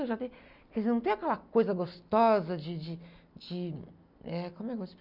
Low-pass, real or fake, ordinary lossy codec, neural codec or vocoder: 5.4 kHz; real; none; none